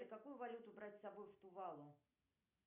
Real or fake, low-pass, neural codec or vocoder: real; 3.6 kHz; none